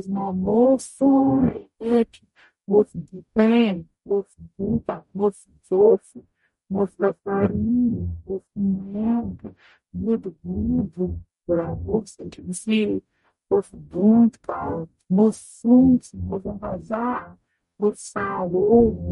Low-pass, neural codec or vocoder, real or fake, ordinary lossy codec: 19.8 kHz; codec, 44.1 kHz, 0.9 kbps, DAC; fake; MP3, 48 kbps